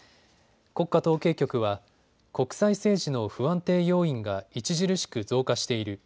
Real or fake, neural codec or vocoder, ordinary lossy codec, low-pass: real; none; none; none